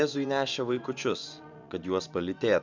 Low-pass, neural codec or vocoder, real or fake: 7.2 kHz; vocoder, 24 kHz, 100 mel bands, Vocos; fake